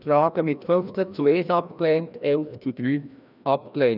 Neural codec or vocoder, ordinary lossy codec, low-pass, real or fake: codec, 16 kHz, 1 kbps, FreqCodec, larger model; none; 5.4 kHz; fake